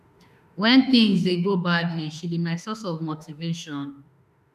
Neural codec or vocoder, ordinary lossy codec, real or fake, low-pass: autoencoder, 48 kHz, 32 numbers a frame, DAC-VAE, trained on Japanese speech; none; fake; 14.4 kHz